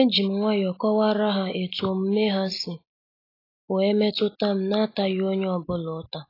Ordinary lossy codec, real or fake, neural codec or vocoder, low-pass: AAC, 24 kbps; real; none; 5.4 kHz